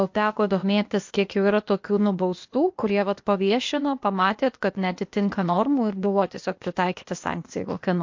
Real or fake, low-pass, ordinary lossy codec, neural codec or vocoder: fake; 7.2 kHz; MP3, 48 kbps; codec, 16 kHz, 0.8 kbps, ZipCodec